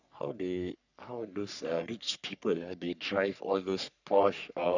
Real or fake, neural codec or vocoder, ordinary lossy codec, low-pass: fake; codec, 44.1 kHz, 3.4 kbps, Pupu-Codec; none; 7.2 kHz